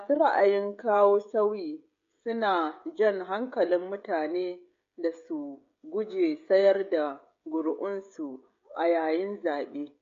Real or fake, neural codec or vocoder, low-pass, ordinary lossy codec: fake; codec, 16 kHz, 16 kbps, FreqCodec, smaller model; 7.2 kHz; MP3, 48 kbps